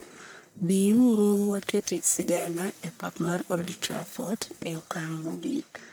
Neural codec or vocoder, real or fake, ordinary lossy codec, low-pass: codec, 44.1 kHz, 1.7 kbps, Pupu-Codec; fake; none; none